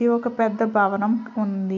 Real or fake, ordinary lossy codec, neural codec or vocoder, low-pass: real; none; none; 7.2 kHz